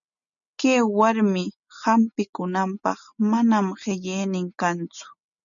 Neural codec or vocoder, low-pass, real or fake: none; 7.2 kHz; real